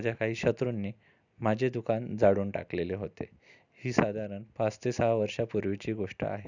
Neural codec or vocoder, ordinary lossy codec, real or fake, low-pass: none; none; real; 7.2 kHz